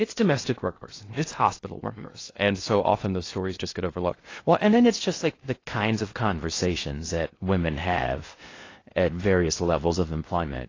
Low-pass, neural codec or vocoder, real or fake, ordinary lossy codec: 7.2 kHz; codec, 16 kHz in and 24 kHz out, 0.6 kbps, FocalCodec, streaming, 2048 codes; fake; AAC, 32 kbps